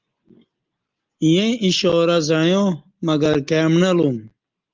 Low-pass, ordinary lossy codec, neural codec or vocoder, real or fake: 7.2 kHz; Opus, 24 kbps; none; real